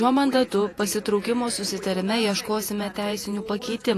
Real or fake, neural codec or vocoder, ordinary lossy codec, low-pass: fake; vocoder, 48 kHz, 128 mel bands, Vocos; AAC, 48 kbps; 14.4 kHz